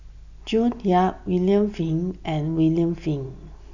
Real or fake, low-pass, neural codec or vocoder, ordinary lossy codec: real; 7.2 kHz; none; none